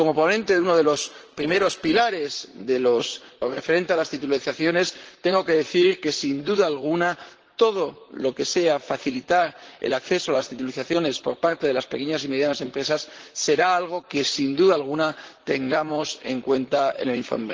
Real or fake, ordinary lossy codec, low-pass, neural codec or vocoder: fake; Opus, 16 kbps; 7.2 kHz; vocoder, 44.1 kHz, 128 mel bands, Pupu-Vocoder